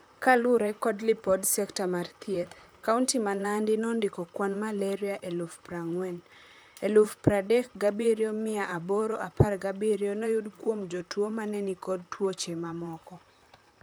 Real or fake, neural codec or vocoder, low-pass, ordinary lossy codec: fake; vocoder, 44.1 kHz, 128 mel bands, Pupu-Vocoder; none; none